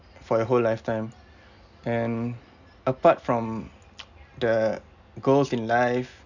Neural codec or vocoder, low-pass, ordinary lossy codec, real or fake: none; 7.2 kHz; none; real